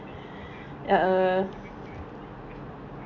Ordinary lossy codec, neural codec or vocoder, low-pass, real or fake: none; none; 7.2 kHz; real